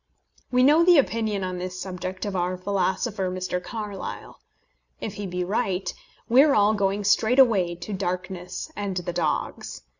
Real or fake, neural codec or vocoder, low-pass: real; none; 7.2 kHz